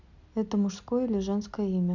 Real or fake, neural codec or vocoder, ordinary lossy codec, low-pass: real; none; none; 7.2 kHz